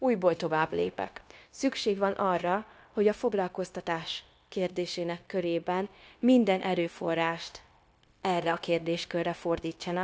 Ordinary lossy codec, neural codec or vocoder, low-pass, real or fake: none; codec, 16 kHz, 0.9 kbps, LongCat-Audio-Codec; none; fake